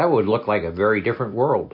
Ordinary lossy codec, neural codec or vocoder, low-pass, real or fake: MP3, 32 kbps; none; 5.4 kHz; real